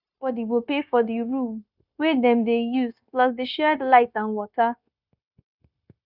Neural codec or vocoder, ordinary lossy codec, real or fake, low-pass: codec, 16 kHz, 0.9 kbps, LongCat-Audio-Codec; none; fake; 5.4 kHz